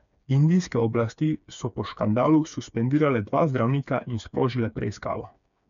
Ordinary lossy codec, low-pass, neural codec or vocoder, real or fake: none; 7.2 kHz; codec, 16 kHz, 4 kbps, FreqCodec, smaller model; fake